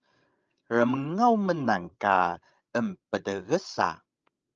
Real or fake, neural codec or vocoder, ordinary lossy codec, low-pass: fake; codec, 16 kHz, 16 kbps, FreqCodec, larger model; Opus, 24 kbps; 7.2 kHz